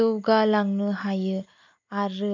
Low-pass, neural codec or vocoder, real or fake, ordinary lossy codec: 7.2 kHz; none; real; MP3, 48 kbps